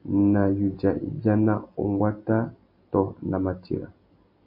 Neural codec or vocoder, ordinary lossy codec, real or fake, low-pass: none; MP3, 32 kbps; real; 5.4 kHz